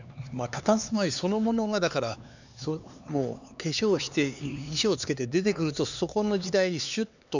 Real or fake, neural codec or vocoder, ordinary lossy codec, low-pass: fake; codec, 16 kHz, 4 kbps, X-Codec, HuBERT features, trained on LibriSpeech; none; 7.2 kHz